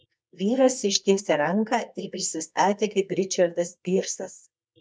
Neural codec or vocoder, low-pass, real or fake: codec, 24 kHz, 0.9 kbps, WavTokenizer, medium music audio release; 9.9 kHz; fake